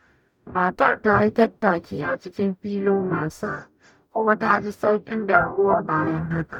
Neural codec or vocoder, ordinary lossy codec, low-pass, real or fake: codec, 44.1 kHz, 0.9 kbps, DAC; none; 19.8 kHz; fake